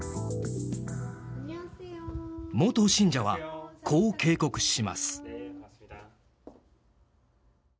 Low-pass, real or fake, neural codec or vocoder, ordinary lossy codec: none; real; none; none